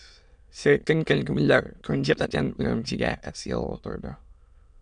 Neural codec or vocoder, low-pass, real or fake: autoencoder, 22.05 kHz, a latent of 192 numbers a frame, VITS, trained on many speakers; 9.9 kHz; fake